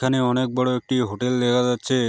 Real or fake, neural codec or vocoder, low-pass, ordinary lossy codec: real; none; none; none